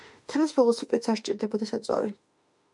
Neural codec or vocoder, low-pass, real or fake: autoencoder, 48 kHz, 32 numbers a frame, DAC-VAE, trained on Japanese speech; 10.8 kHz; fake